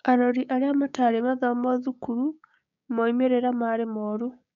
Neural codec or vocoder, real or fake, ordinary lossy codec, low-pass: codec, 16 kHz, 6 kbps, DAC; fake; none; 7.2 kHz